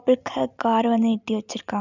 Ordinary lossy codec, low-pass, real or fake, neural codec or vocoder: none; 7.2 kHz; real; none